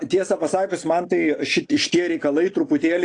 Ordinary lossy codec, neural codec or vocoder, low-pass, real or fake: AAC, 48 kbps; none; 9.9 kHz; real